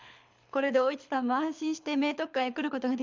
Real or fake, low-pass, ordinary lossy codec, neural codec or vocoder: fake; 7.2 kHz; MP3, 64 kbps; codec, 24 kHz, 6 kbps, HILCodec